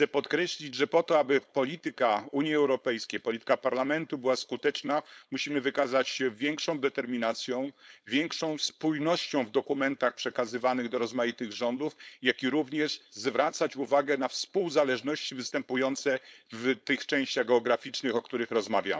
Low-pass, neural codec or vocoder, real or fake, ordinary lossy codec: none; codec, 16 kHz, 4.8 kbps, FACodec; fake; none